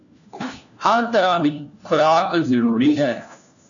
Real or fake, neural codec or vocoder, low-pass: fake; codec, 16 kHz, 1 kbps, FunCodec, trained on LibriTTS, 50 frames a second; 7.2 kHz